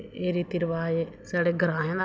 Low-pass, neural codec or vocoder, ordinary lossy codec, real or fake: none; none; none; real